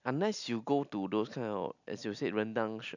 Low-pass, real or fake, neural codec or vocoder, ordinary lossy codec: 7.2 kHz; real; none; none